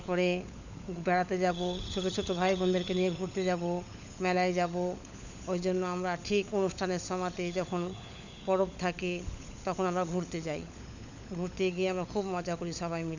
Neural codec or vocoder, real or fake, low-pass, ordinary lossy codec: codec, 16 kHz, 16 kbps, FunCodec, trained on LibriTTS, 50 frames a second; fake; 7.2 kHz; none